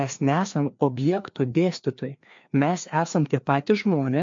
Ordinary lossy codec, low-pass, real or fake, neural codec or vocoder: MP3, 48 kbps; 7.2 kHz; fake; codec, 16 kHz, 2 kbps, FreqCodec, larger model